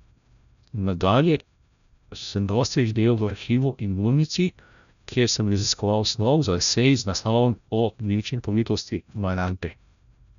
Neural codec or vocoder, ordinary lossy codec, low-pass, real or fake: codec, 16 kHz, 0.5 kbps, FreqCodec, larger model; none; 7.2 kHz; fake